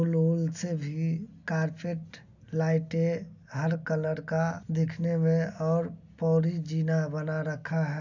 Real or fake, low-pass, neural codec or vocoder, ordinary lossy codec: real; 7.2 kHz; none; none